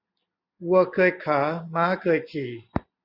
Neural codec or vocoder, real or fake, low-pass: none; real; 5.4 kHz